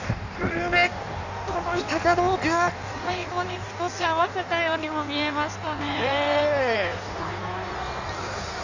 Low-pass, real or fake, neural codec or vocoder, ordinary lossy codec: 7.2 kHz; fake; codec, 16 kHz in and 24 kHz out, 1.1 kbps, FireRedTTS-2 codec; none